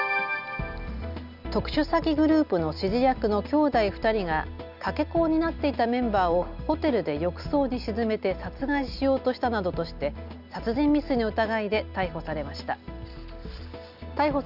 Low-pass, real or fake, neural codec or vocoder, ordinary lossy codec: 5.4 kHz; real; none; none